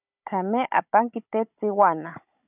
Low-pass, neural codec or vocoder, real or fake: 3.6 kHz; codec, 16 kHz, 16 kbps, FunCodec, trained on Chinese and English, 50 frames a second; fake